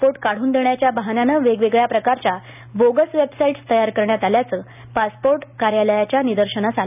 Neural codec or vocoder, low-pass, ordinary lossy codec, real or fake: none; 3.6 kHz; none; real